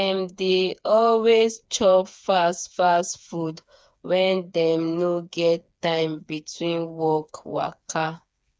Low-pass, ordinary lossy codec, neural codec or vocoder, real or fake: none; none; codec, 16 kHz, 4 kbps, FreqCodec, smaller model; fake